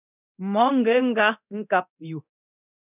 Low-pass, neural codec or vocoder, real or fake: 3.6 kHz; codec, 24 kHz, 0.9 kbps, DualCodec; fake